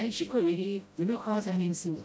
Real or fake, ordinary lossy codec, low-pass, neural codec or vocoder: fake; none; none; codec, 16 kHz, 0.5 kbps, FreqCodec, smaller model